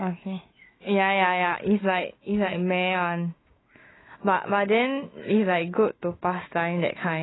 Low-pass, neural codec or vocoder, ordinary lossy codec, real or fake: 7.2 kHz; autoencoder, 48 kHz, 128 numbers a frame, DAC-VAE, trained on Japanese speech; AAC, 16 kbps; fake